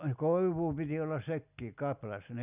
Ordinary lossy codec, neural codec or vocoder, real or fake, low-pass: none; none; real; 3.6 kHz